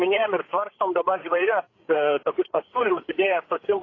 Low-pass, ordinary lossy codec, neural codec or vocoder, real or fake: 7.2 kHz; AAC, 32 kbps; codec, 16 kHz, 8 kbps, FreqCodec, larger model; fake